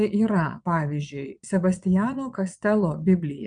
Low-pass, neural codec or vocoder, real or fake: 9.9 kHz; vocoder, 22.05 kHz, 80 mel bands, Vocos; fake